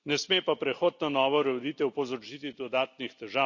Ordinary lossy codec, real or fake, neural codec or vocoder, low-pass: none; real; none; 7.2 kHz